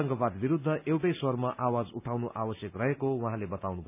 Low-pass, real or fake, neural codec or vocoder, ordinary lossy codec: 3.6 kHz; real; none; none